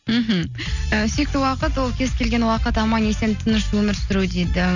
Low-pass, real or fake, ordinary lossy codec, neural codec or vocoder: 7.2 kHz; real; none; none